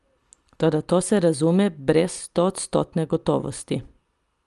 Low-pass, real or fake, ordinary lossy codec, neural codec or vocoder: 10.8 kHz; real; Opus, 32 kbps; none